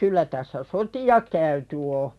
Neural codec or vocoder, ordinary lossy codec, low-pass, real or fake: none; none; none; real